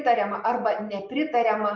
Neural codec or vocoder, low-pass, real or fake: none; 7.2 kHz; real